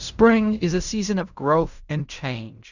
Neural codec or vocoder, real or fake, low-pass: codec, 16 kHz in and 24 kHz out, 0.4 kbps, LongCat-Audio-Codec, fine tuned four codebook decoder; fake; 7.2 kHz